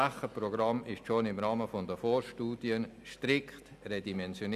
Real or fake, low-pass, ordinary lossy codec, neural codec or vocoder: real; 14.4 kHz; none; none